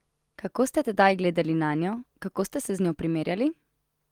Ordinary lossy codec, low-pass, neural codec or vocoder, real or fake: Opus, 24 kbps; 19.8 kHz; none; real